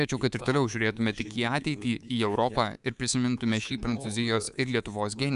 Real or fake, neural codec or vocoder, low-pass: fake; codec, 24 kHz, 3.1 kbps, DualCodec; 10.8 kHz